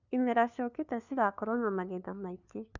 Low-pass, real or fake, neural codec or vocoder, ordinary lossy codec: 7.2 kHz; fake; codec, 16 kHz, 2 kbps, FunCodec, trained on LibriTTS, 25 frames a second; none